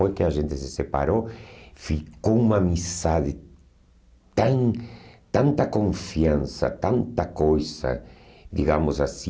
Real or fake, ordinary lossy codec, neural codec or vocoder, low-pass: real; none; none; none